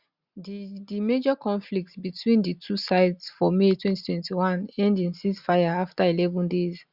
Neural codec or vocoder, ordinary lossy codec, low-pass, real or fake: none; Opus, 64 kbps; 5.4 kHz; real